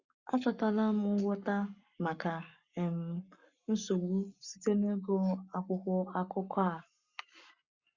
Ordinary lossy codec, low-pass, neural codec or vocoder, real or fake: Opus, 64 kbps; 7.2 kHz; codec, 44.1 kHz, 7.8 kbps, Pupu-Codec; fake